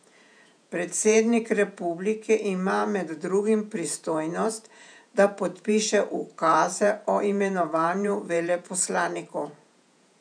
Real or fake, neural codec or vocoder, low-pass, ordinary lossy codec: fake; vocoder, 48 kHz, 128 mel bands, Vocos; 9.9 kHz; none